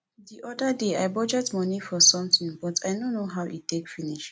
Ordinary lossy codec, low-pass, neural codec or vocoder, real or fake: none; none; none; real